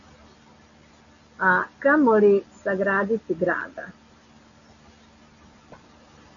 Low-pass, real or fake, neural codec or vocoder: 7.2 kHz; real; none